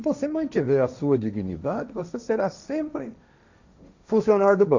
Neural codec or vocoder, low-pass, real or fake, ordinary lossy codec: codec, 16 kHz, 1.1 kbps, Voila-Tokenizer; 7.2 kHz; fake; none